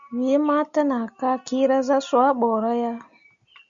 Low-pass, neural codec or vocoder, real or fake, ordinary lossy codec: 7.2 kHz; none; real; Opus, 64 kbps